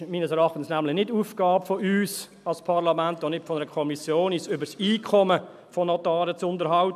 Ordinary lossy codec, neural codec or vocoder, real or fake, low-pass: none; none; real; 14.4 kHz